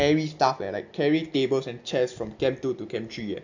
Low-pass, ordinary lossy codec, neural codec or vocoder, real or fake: 7.2 kHz; AAC, 48 kbps; none; real